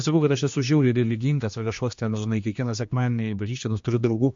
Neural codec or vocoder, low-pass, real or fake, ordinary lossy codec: codec, 16 kHz, 2 kbps, X-Codec, HuBERT features, trained on general audio; 7.2 kHz; fake; MP3, 48 kbps